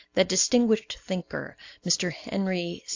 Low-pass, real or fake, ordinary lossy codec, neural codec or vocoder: 7.2 kHz; real; AAC, 48 kbps; none